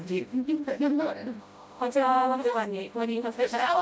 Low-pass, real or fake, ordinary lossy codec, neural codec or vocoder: none; fake; none; codec, 16 kHz, 0.5 kbps, FreqCodec, smaller model